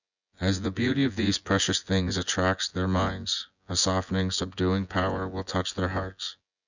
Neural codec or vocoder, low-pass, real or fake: vocoder, 24 kHz, 100 mel bands, Vocos; 7.2 kHz; fake